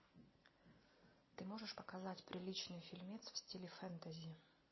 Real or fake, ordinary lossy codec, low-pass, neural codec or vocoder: real; MP3, 24 kbps; 7.2 kHz; none